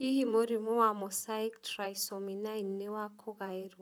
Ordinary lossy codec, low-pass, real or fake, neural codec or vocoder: none; none; fake; vocoder, 44.1 kHz, 128 mel bands, Pupu-Vocoder